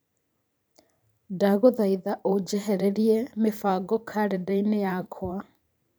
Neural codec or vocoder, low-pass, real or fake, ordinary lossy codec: vocoder, 44.1 kHz, 128 mel bands every 512 samples, BigVGAN v2; none; fake; none